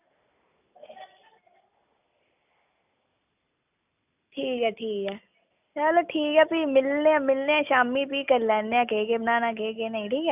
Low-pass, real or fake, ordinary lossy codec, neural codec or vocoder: 3.6 kHz; real; none; none